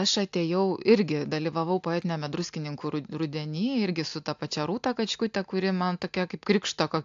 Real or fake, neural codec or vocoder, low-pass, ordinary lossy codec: real; none; 7.2 kHz; AAC, 64 kbps